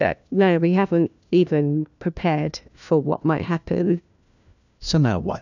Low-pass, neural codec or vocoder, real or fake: 7.2 kHz; codec, 16 kHz, 1 kbps, FunCodec, trained on LibriTTS, 50 frames a second; fake